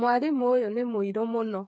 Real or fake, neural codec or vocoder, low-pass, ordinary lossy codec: fake; codec, 16 kHz, 4 kbps, FreqCodec, smaller model; none; none